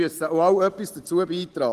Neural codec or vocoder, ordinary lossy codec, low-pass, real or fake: none; Opus, 16 kbps; 14.4 kHz; real